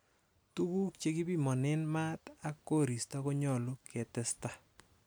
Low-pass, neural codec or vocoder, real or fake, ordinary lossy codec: none; none; real; none